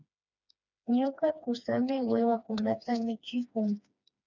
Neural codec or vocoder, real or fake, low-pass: codec, 16 kHz, 2 kbps, FreqCodec, smaller model; fake; 7.2 kHz